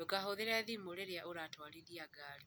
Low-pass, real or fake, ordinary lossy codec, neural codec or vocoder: none; real; none; none